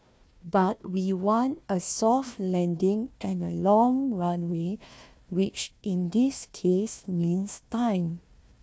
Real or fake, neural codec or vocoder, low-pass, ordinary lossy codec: fake; codec, 16 kHz, 1 kbps, FunCodec, trained on Chinese and English, 50 frames a second; none; none